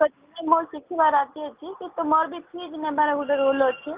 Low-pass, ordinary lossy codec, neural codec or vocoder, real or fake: 3.6 kHz; Opus, 24 kbps; none; real